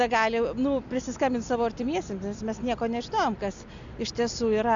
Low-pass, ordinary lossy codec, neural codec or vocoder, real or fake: 7.2 kHz; AAC, 64 kbps; none; real